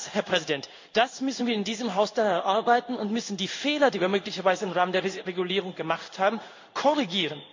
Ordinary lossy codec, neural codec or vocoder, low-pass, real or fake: MP3, 48 kbps; codec, 16 kHz in and 24 kHz out, 1 kbps, XY-Tokenizer; 7.2 kHz; fake